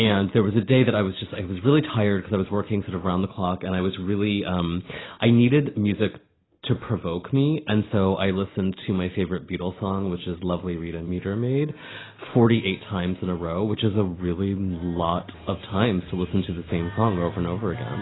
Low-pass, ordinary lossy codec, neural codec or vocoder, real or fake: 7.2 kHz; AAC, 16 kbps; none; real